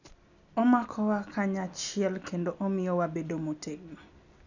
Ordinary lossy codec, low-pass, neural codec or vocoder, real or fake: none; 7.2 kHz; none; real